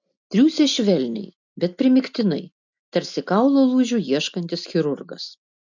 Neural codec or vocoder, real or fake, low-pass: none; real; 7.2 kHz